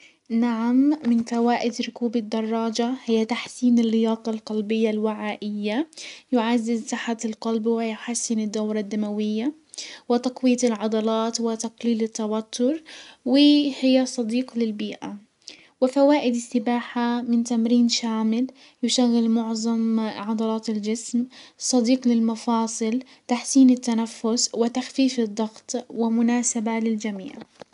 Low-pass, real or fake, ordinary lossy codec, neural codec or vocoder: 10.8 kHz; real; none; none